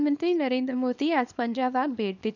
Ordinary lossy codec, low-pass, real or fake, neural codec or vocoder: none; 7.2 kHz; fake; codec, 24 kHz, 0.9 kbps, WavTokenizer, small release